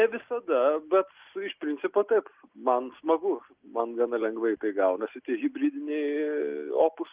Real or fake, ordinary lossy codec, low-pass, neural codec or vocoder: real; Opus, 24 kbps; 3.6 kHz; none